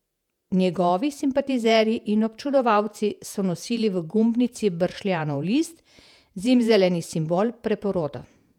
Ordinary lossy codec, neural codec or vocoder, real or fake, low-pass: none; vocoder, 48 kHz, 128 mel bands, Vocos; fake; 19.8 kHz